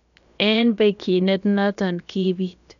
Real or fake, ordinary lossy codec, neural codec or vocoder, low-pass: fake; none; codec, 16 kHz, about 1 kbps, DyCAST, with the encoder's durations; 7.2 kHz